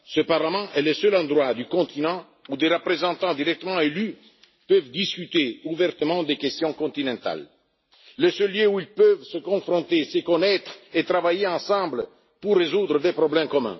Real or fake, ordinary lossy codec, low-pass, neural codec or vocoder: real; MP3, 24 kbps; 7.2 kHz; none